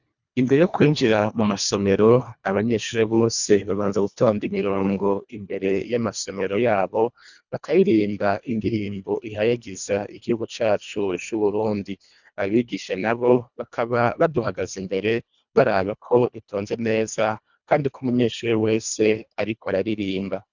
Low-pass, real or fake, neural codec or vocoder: 7.2 kHz; fake; codec, 24 kHz, 1.5 kbps, HILCodec